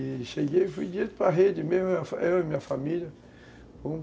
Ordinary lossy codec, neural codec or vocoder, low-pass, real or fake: none; none; none; real